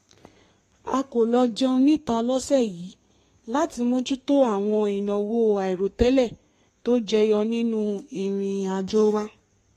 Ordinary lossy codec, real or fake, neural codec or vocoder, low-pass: AAC, 48 kbps; fake; codec, 32 kHz, 1.9 kbps, SNAC; 14.4 kHz